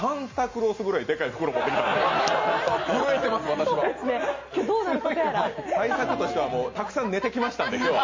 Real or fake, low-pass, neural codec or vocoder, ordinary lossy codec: real; 7.2 kHz; none; MP3, 32 kbps